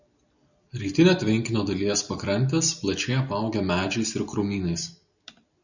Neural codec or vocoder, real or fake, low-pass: none; real; 7.2 kHz